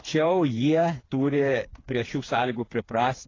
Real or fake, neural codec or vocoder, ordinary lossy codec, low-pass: fake; codec, 16 kHz, 4 kbps, FreqCodec, smaller model; AAC, 32 kbps; 7.2 kHz